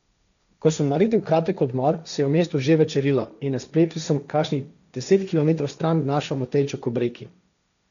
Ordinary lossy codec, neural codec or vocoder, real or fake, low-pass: none; codec, 16 kHz, 1.1 kbps, Voila-Tokenizer; fake; 7.2 kHz